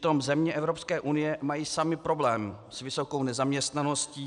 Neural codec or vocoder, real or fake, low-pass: none; real; 10.8 kHz